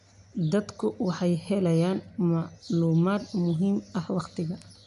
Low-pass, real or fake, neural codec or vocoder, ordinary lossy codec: 10.8 kHz; real; none; MP3, 96 kbps